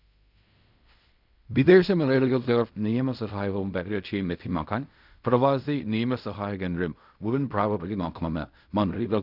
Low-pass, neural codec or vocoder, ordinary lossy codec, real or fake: 5.4 kHz; codec, 16 kHz in and 24 kHz out, 0.4 kbps, LongCat-Audio-Codec, fine tuned four codebook decoder; none; fake